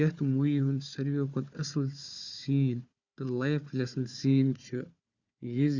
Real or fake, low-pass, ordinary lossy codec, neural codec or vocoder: fake; 7.2 kHz; Opus, 64 kbps; codec, 16 kHz, 4 kbps, FunCodec, trained on Chinese and English, 50 frames a second